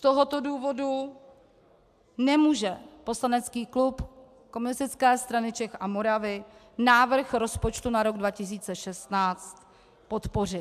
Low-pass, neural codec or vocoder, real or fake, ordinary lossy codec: 14.4 kHz; none; real; AAC, 96 kbps